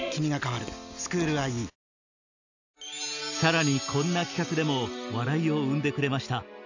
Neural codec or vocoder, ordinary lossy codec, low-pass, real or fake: none; none; 7.2 kHz; real